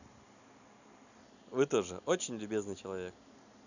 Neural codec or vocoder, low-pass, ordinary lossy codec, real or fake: none; 7.2 kHz; none; real